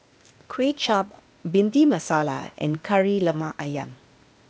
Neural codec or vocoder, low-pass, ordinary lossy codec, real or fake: codec, 16 kHz, 0.8 kbps, ZipCodec; none; none; fake